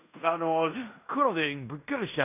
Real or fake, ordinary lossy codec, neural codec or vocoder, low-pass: fake; none; codec, 16 kHz in and 24 kHz out, 0.9 kbps, LongCat-Audio-Codec, fine tuned four codebook decoder; 3.6 kHz